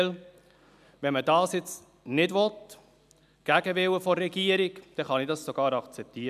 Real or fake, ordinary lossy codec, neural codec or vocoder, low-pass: real; none; none; 14.4 kHz